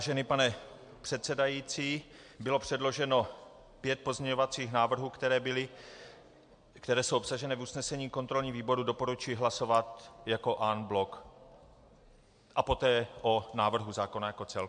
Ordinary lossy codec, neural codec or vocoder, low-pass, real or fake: MP3, 64 kbps; none; 9.9 kHz; real